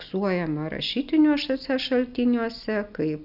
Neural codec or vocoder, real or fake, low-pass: none; real; 5.4 kHz